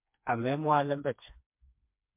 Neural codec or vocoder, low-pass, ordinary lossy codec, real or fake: codec, 16 kHz, 2 kbps, FreqCodec, smaller model; 3.6 kHz; MP3, 32 kbps; fake